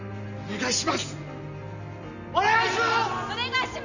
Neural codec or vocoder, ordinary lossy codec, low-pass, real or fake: none; none; 7.2 kHz; real